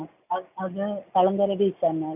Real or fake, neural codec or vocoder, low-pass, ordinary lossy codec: real; none; 3.6 kHz; none